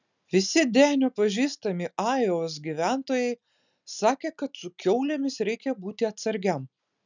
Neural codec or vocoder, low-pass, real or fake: none; 7.2 kHz; real